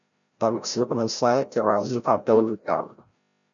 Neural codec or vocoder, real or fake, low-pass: codec, 16 kHz, 0.5 kbps, FreqCodec, larger model; fake; 7.2 kHz